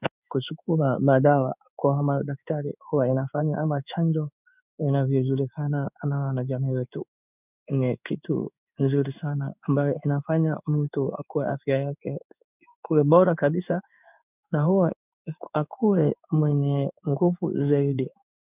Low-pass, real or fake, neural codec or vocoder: 3.6 kHz; fake; codec, 16 kHz in and 24 kHz out, 1 kbps, XY-Tokenizer